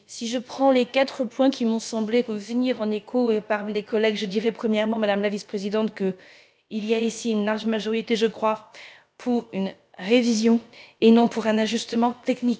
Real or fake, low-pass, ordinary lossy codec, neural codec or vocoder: fake; none; none; codec, 16 kHz, about 1 kbps, DyCAST, with the encoder's durations